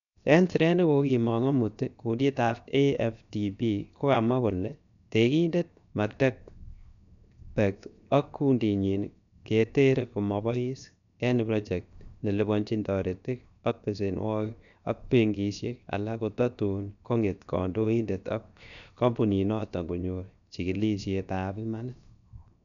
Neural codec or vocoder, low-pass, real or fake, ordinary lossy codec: codec, 16 kHz, 0.7 kbps, FocalCodec; 7.2 kHz; fake; none